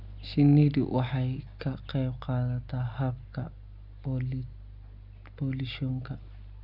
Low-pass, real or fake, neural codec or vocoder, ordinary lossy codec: 5.4 kHz; real; none; none